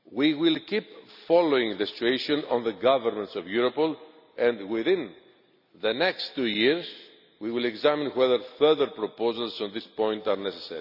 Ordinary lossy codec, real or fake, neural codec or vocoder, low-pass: none; real; none; 5.4 kHz